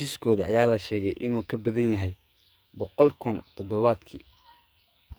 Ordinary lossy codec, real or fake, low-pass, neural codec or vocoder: none; fake; none; codec, 44.1 kHz, 2.6 kbps, SNAC